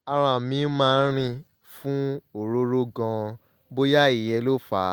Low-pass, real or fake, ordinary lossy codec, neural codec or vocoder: 19.8 kHz; real; Opus, 24 kbps; none